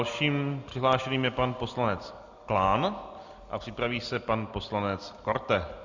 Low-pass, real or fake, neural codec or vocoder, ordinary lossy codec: 7.2 kHz; real; none; Opus, 64 kbps